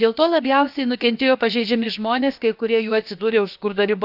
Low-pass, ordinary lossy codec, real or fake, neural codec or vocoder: 5.4 kHz; none; fake; codec, 16 kHz, about 1 kbps, DyCAST, with the encoder's durations